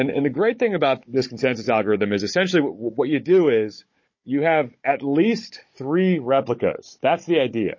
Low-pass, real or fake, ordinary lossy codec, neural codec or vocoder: 7.2 kHz; fake; MP3, 32 kbps; codec, 16 kHz, 16 kbps, FunCodec, trained on Chinese and English, 50 frames a second